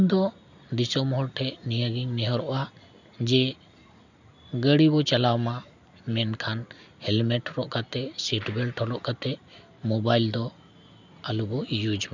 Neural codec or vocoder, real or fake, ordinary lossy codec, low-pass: none; real; none; 7.2 kHz